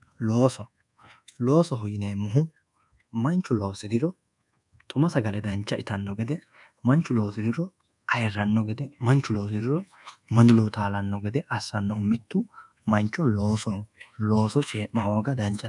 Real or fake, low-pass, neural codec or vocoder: fake; 10.8 kHz; codec, 24 kHz, 1.2 kbps, DualCodec